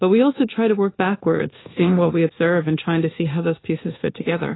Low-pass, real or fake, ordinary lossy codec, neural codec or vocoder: 7.2 kHz; fake; AAC, 16 kbps; codec, 24 kHz, 1.2 kbps, DualCodec